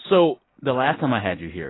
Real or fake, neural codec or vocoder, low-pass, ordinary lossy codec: real; none; 7.2 kHz; AAC, 16 kbps